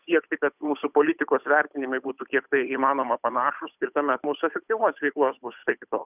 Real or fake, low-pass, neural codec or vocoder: fake; 3.6 kHz; codec, 16 kHz, 8 kbps, FunCodec, trained on Chinese and English, 25 frames a second